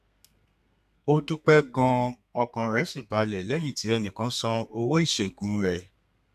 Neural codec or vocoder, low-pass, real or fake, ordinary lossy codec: codec, 32 kHz, 1.9 kbps, SNAC; 14.4 kHz; fake; none